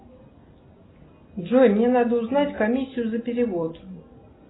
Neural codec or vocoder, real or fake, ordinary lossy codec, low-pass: none; real; AAC, 16 kbps; 7.2 kHz